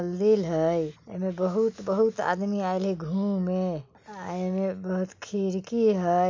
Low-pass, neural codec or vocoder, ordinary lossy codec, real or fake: 7.2 kHz; none; MP3, 64 kbps; real